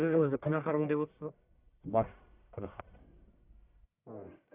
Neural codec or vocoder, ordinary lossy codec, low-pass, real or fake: codec, 44.1 kHz, 1.7 kbps, Pupu-Codec; none; 3.6 kHz; fake